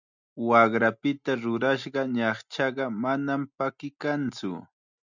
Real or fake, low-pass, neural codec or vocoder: real; 7.2 kHz; none